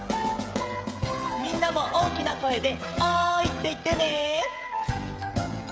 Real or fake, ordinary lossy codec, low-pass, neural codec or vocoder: fake; none; none; codec, 16 kHz, 16 kbps, FreqCodec, smaller model